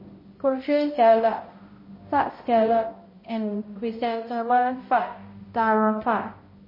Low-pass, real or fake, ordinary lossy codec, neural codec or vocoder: 5.4 kHz; fake; MP3, 24 kbps; codec, 16 kHz, 0.5 kbps, X-Codec, HuBERT features, trained on balanced general audio